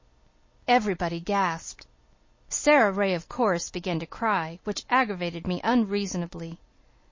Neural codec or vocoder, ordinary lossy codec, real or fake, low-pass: none; MP3, 32 kbps; real; 7.2 kHz